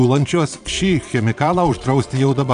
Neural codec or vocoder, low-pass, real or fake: vocoder, 22.05 kHz, 80 mel bands, Vocos; 9.9 kHz; fake